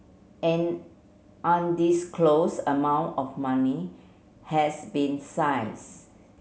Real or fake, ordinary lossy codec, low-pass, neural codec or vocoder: real; none; none; none